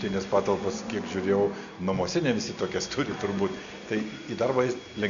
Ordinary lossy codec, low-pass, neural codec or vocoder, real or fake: AAC, 64 kbps; 7.2 kHz; none; real